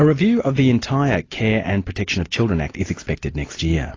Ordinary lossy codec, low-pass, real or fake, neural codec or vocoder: AAC, 32 kbps; 7.2 kHz; real; none